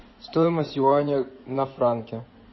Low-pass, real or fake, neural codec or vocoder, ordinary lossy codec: 7.2 kHz; fake; codec, 16 kHz in and 24 kHz out, 2.2 kbps, FireRedTTS-2 codec; MP3, 24 kbps